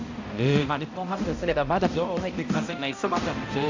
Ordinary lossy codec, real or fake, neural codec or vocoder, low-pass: AAC, 48 kbps; fake; codec, 16 kHz, 0.5 kbps, X-Codec, HuBERT features, trained on balanced general audio; 7.2 kHz